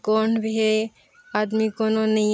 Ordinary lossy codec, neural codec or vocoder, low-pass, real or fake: none; none; none; real